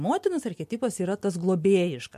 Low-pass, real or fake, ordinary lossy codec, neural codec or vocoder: 14.4 kHz; real; MP3, 64 kbps; none